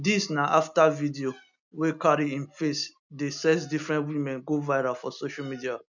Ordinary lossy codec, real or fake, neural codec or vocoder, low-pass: none; real; none; 7.2 kHz